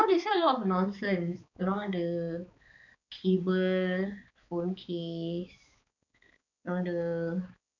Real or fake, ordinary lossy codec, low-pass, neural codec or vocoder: fake; none; 7.2 kHz; codec, 16 kHz, 4 kbps, X-Codec, HuBERT features, trained on balanced general audio